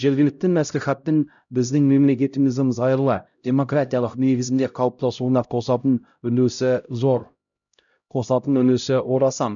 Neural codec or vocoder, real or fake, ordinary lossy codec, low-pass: codec, 16 kHz, 0.5 kbps, X-Codec, HuBERT features, trained on LibriSpeech; fake; none; 7.2 kHz